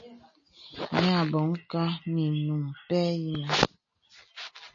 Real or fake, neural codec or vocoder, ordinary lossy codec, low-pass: real; none; MP3, 32 kbps; 7.2 kHz